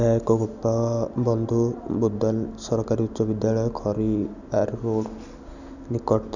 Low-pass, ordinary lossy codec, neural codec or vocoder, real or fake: 7.2 kHz; none; none; real